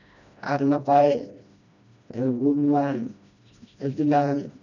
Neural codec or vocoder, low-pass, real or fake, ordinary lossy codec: codec, 16 kHz, 1 kbps, FreqCodec, smaller model; 7.2 kHz; fake; none